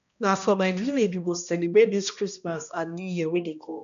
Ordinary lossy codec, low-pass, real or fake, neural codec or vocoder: none; 7.2 kHz; fake; codec, 16 kHz, 1 kbps, X-Codec, HuBERT features, trained on balanced general audio